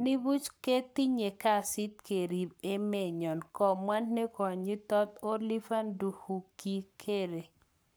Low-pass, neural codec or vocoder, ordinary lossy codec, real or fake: none; codec, 44.1 kHz, 7.8 kbps, Pupu-Codec; none; fake